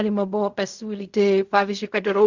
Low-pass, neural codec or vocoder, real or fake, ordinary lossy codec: 7.2 kHz; codec, 16 kHz in and 24 kHz out, 0.4 kbps, LongCat-Audio-Codec, fine tuned four codebook decoder; fake; Opus, 64 kbps